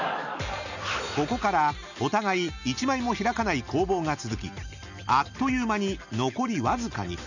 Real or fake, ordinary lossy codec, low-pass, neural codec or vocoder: real; none; 7.2 kHz; none